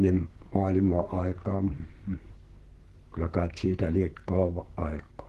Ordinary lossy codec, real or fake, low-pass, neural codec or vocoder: Opus, 16 kbps; fake; 14.4 kHz; codec, 44.1 kHz, 2.6 kbps, SNAC